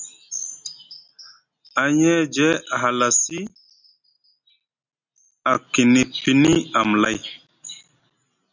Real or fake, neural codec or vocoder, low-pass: real; none; 7.2 kHz